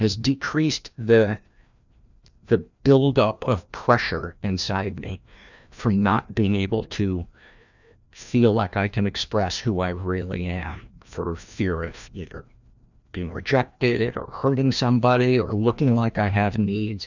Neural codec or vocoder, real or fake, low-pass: codec, 16 kHz, 1 kbps, FreqCodec, larger model; fake; 7.2 kHz